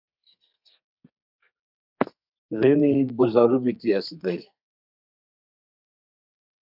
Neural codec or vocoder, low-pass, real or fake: codec, 32 kHz, 1.9 kbps, SNAC; 5.4 kHz; fake